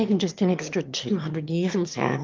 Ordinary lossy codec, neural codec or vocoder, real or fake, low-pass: Opus, 32 kbps; autoencoder, 22.05 kHz, a latent of 192 numbers a frame, VITS, trained on one speaker; fake; 7.2 kHz